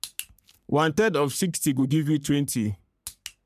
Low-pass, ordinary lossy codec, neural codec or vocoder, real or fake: 14.4 kHz; none; codec, 44.1 kHz, 3.4 kbps, Pupu-Codec; fake